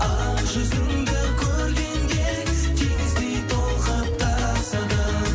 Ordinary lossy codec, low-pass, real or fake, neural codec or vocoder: none; none; real; none